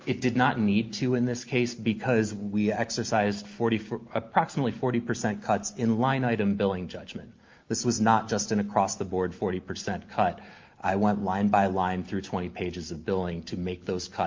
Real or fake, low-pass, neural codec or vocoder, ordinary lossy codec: real; 7.2 kHz; none; Opus, 24 kbps